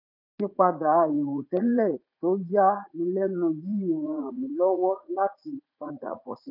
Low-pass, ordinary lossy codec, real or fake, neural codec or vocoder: 5.4 kHz; none; fake; vocoder, 44.1 kHz, 80 mel bands, Vocos